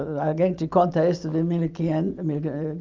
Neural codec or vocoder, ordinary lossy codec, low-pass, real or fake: none; Opus, 24 kbps; 7.2 kHz; real